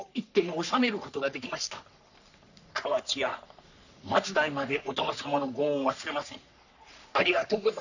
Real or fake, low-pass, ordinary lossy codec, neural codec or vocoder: fake; 7.2 kHz; none; codec, 44.1 kHz, 3.4 kbps, Pupu-Codec